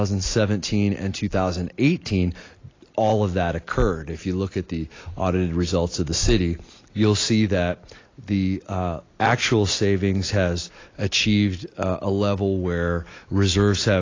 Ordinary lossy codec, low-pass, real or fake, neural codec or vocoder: AAC, 32 kbps; 7.2 kHz; real; none